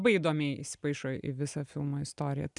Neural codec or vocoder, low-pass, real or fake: none; 10.8 kHz; real